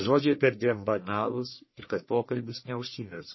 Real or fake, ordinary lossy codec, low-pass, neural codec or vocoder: fake; MP3, 24 kbps; 7.2 kHz; codec, 44.1 kHz, 1.7 kbps, Pupu-Codec